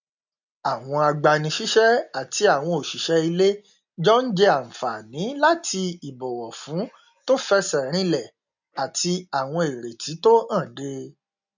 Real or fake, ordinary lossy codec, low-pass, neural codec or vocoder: real; none; 7.2 kHz; none